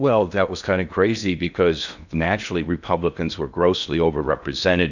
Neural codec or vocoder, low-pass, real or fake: codec, 16 kHz in and 24 kHz out, 0.6 kbps, FocalCodec, streaming, 2048 codes; 7.2 kHz; fake